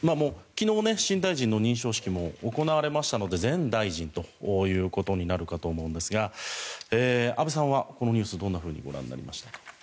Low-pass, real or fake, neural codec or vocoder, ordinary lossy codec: none; real; none; none